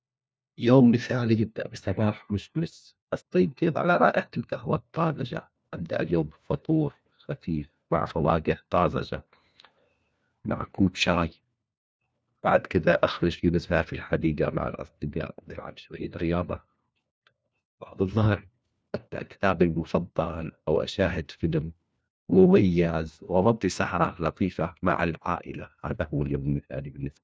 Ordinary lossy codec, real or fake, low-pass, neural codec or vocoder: none; fake; none; codec, 16 kHz, 1 kbps, FunCodec, trained on LibriTTS, 50 frames a second